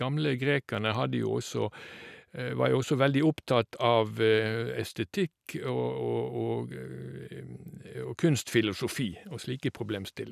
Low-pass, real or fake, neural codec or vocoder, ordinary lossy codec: 14.4 kHz; real; none; none